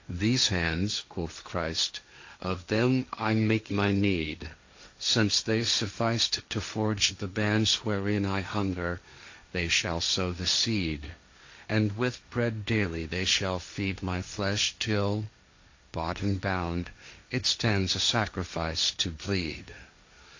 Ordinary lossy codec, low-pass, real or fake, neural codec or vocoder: AAC, 48 kbps; 7.2 kHz; fake; codec, 16 kHz, 1.1 kbps, Voila-Tokenizer